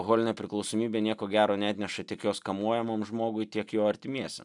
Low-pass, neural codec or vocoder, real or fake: 10.8 kHz; none; real